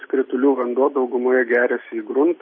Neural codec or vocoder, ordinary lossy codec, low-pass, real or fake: none; MP3, 24 kbps; 7.2 kHz; real